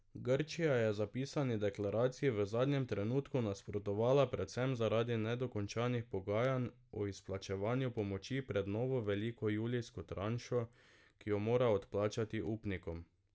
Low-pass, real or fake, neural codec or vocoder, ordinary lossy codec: none; real; none; none